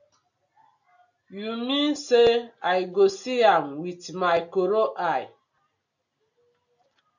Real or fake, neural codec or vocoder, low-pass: real; none; 7.2 kHz